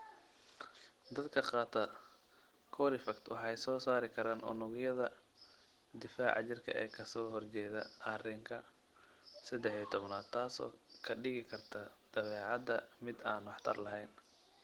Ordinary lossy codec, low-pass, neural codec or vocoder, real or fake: Opus, 16 kbps; 19.8 kHz; none; real